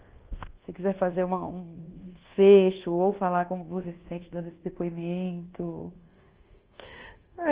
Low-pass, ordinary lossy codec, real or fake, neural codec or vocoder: 3.6 kHz; Opus, 16 kbps; fake; codec, 24 kHz, 1.2 kbps, DualCodec